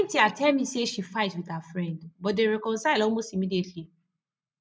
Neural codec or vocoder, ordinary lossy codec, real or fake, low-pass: none; none; real; none